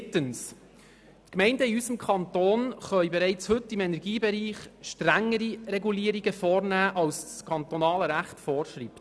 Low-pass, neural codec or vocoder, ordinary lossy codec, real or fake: none; none; none; real